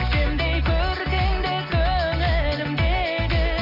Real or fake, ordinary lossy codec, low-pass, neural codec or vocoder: real; none; 5.4 kHz; none